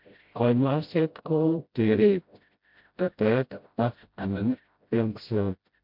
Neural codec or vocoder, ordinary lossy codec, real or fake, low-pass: codec, 16 kHz, 0.5 kbps, FreqCodec, smaller model; MP3, 48 kbps; fake; 5.4 kHz